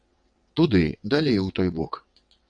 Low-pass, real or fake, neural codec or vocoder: 9.9 kHz; fake; vocoder, 22.05 kHz, 80 mel bands, WaveNeXt